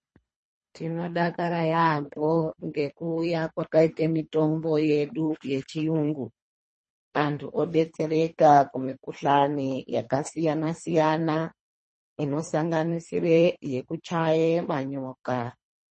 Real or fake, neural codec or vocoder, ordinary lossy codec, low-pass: fake; codec, 24 kHz, 3 kbps, HILCodec; MP3, 32 kbps; 10.8 kHz